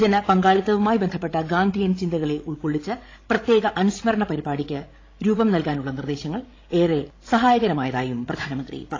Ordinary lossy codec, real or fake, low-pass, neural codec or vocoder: AAC, 32 kbps; fake; 7.2 kHz; codec, 16 kHz, 16 kbps, FreqCodec, larger model